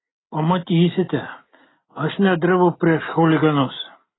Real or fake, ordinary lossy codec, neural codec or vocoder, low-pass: real; AAC, 16 kbps; none; 7.2 kHz